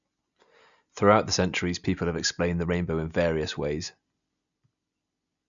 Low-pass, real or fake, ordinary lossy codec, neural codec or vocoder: 7.2 kHz; real; none; none